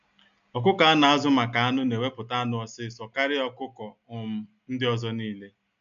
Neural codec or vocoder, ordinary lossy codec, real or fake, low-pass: none; none; real; 7.2 kHz